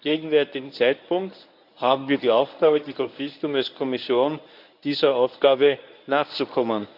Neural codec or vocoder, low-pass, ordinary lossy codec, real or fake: codec, 24 kHz, 0.9 kbps, WavTokenizer, medium speech release version 1; 5.4 kHz; none; fake